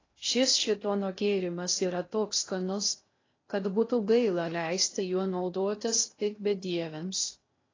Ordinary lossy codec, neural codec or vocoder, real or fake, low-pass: AAC, 32 kbps; codec, 16 kHz in and 24 kHz out, 0.6 kbps, FocalCodec, streaming, 2048 codes; fake; 7.2 kHz